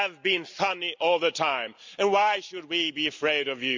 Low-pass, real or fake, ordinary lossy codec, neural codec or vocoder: 7.2 kHz; real; MP3, 64 kbps; none